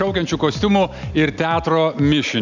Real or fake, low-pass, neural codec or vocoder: real; 7.2 kHz; none